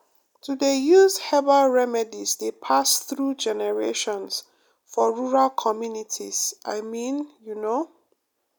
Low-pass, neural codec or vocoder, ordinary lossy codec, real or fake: none; none; none; real